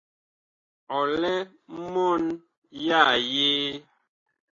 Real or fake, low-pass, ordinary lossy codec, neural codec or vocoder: real; 7.2 kHz; AAC, 32 kbps; none